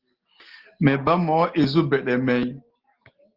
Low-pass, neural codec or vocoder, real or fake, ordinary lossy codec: 5.4 kHz; none; real; Opus, 16 kbps